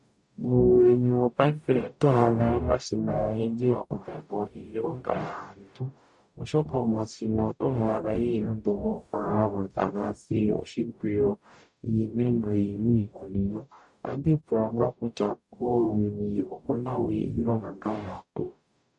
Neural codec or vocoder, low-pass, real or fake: codec, 44.1 kHz, 0.9 kbps, DAC; 10.8 kHz; fake